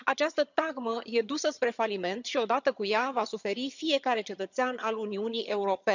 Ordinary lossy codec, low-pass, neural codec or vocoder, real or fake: none; 7.2 kHz; vocoder, 22.05 kHz, 80 mel bands, HiFi-GAN; fake